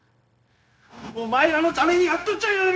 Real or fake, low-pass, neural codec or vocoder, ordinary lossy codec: fake; none; codec, 16 kHz, 0.9 kbps, LongCat-Audio-Codec; none